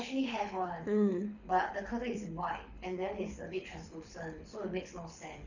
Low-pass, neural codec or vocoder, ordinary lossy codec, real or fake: 7.2 kHz; codec, 24 kHz, 6 kbps, HILCodec; none; fake